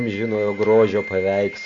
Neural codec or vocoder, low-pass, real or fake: none; 7.2 kHz; real